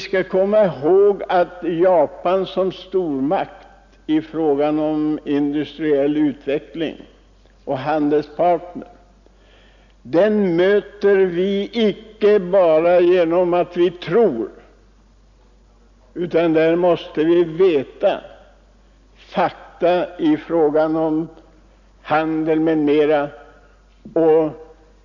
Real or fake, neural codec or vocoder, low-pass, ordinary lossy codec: real; none; 7.2 kHz; none